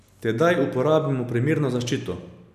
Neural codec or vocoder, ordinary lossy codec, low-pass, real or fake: none; none; 14.4 kHz; real